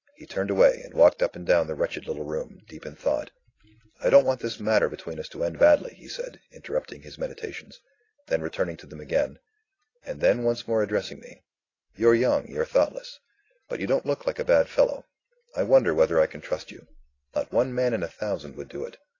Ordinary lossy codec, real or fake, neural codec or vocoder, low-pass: AAC, 32 kbps; real; none; 7.2 kHz